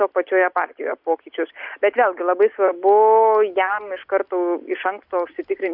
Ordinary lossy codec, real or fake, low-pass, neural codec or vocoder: Opus, 64 kbps; real; 5.4 kHz; none